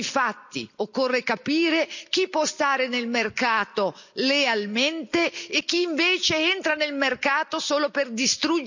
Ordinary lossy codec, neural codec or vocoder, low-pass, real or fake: none; none; 7.2 kHz; real